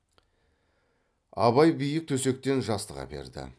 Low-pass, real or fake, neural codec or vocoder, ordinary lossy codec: none; real; none; none